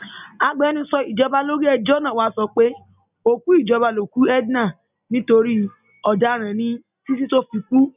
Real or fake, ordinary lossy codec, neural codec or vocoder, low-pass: real; none; none; 3.6 kHz